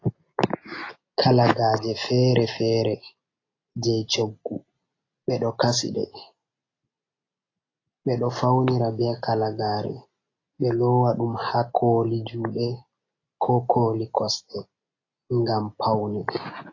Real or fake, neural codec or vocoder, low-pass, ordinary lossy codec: real; none; 7.2 kHz; AAC, 32 kbps